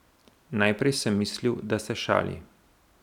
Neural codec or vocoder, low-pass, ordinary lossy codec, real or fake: none; 19.8 kHz; none; real